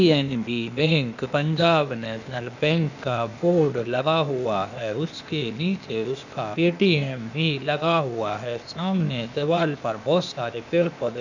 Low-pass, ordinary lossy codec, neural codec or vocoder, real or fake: 7.2 kHz; none; codec, 16 kHz, 0.8 kbps, ZipCodec; fake